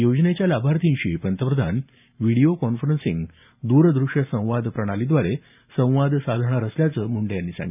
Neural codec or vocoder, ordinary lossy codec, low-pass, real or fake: none; none; 3.6 kHz; real